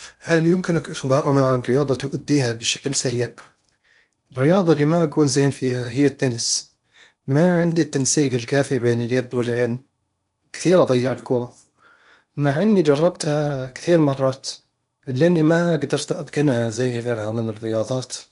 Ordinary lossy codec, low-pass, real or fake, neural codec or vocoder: none; 10.8 kHz; fake; codec, 16 kHz in and 24 kHz out, 0.8 kbps, FocalCodec, streaming, 65536 codes